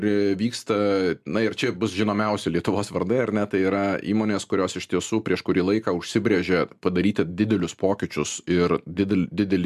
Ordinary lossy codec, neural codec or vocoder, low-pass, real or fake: MP3, 96 kbps; none; 14.4 kHz; real